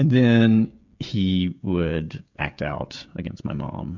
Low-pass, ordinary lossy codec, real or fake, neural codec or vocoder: 7.2 kHz; MP3, 64 kbps; fake; codec, 16 kHz, 16 kbps, FreqCodec, smaller model